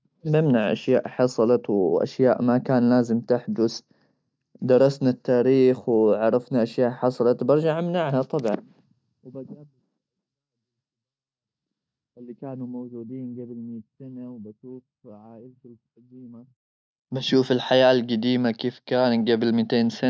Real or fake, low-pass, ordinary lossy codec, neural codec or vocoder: real; none; none; none